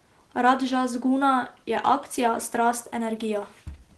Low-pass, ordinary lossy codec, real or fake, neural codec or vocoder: 10.8 kHz; Opus, 16 kbps; real; none